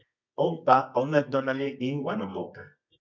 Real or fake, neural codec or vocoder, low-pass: fake; codec, 24 kHz, 0.9 kbps, WavTokenizer, medium music audio release; 7.2 kHz